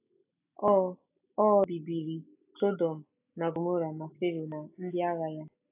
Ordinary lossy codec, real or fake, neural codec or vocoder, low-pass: AAC, 24 kbps; real; none; 3.6 kHz